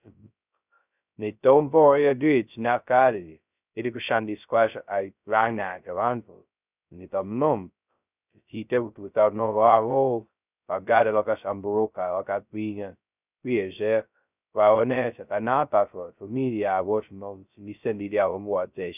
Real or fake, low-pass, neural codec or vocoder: fake; 3.6 kHz; codec, 16 kHz, 0.2 kbps, FocalCodec